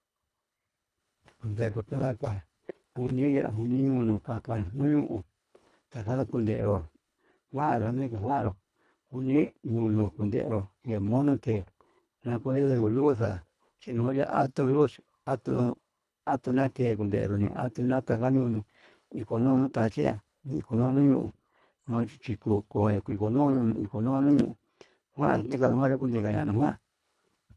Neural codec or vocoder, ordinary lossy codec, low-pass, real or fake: codec, 24 kHz, 1.5 kbps, HILCodec; none; none; fake